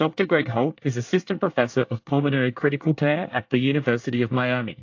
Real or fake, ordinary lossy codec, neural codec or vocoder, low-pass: fake; AAC, 48 kbps; codec, 24 kHz, 1 kbps, SNAC; 7.2 kHz